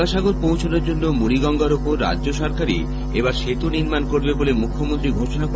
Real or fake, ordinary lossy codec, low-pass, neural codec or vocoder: real; none; none; none